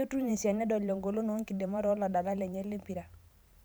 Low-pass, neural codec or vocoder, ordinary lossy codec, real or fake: none; vocoder, 44.1 kHz, 128 mel bands every 512 samples, BigVGAN v2; none; fake